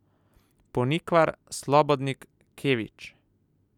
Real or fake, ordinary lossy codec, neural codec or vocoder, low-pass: real; none; none; 19.8 kHz